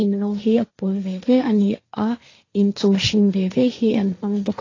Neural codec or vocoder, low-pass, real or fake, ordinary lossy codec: codec, 16 kHz, 1.1 kbps, Voila-Tokenizer; 7.2 kHz; fake; AAC, 32 kbps